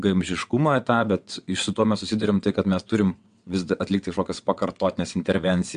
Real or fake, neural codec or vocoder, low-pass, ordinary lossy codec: fake; vocoder, 22.05 kHz, 80 mel bands, WaveNeXt; 9.9 kHz; MP3, 64 kbps